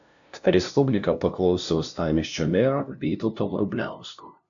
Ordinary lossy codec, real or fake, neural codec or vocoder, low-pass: AAC, 64 kbps; fake; codec, 16 kHz, 0.5 kbps, FunCodec, trained on LibriTTS, 25 frames a second; 7.2 kHz